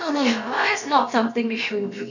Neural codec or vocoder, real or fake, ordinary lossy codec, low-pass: codec, 16 kHz, about 1 kbps, DyCAST, with the encoder's durations; fake; none; 7.2 kHz